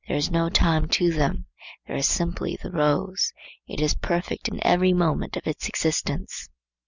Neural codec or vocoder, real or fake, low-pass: none; real; 7.2 kHz